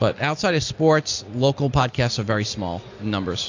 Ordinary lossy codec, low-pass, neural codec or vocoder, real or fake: AAC, 48 kbps; 7.2 kHz; none; real